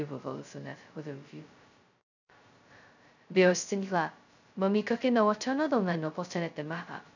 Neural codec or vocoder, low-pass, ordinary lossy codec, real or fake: codec, 16 kHz, 0.2 kbps, FocalCodec; 7.2 kHz; none; fake